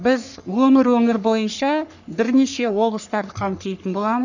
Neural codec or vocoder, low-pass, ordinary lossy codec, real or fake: codec, 44.1 kHz, 3.4 kbps, Pupu-Codec; 7.2 kHz; none; fake